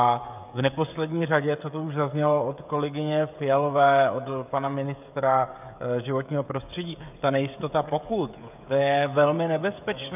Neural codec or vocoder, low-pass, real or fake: codec, 16 kHz, 16 kbps, FreqCodec, smaller model; 3.6 kHz; fake